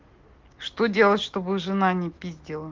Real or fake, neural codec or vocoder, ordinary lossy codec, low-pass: real; none; Opus, 16 kbps; 7.2 kHz